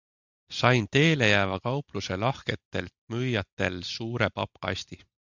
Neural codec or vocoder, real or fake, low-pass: none; real; 7.2 kHz